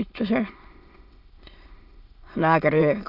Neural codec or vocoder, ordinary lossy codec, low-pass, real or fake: autoencoder, 22.05 kHz, a latent of 192 numbers a frame, VITS, trained on many speakers; Opus, 64 kbps; 5.4 kHz; fake